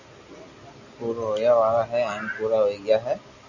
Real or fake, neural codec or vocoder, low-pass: real; none; 7.2 kHz